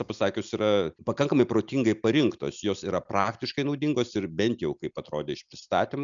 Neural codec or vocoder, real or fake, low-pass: none; real; 7.2 kHz